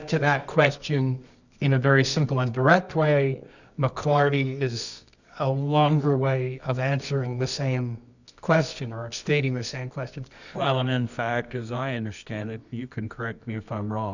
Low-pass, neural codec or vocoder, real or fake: 7.2 kHz; codec, 24 kHz, 0.9 kbps, WavTokenizer, medium music audio release; fake